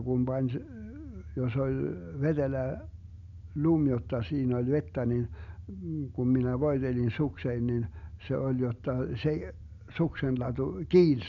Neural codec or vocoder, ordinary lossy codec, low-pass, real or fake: none; none; 7.2 kHz; real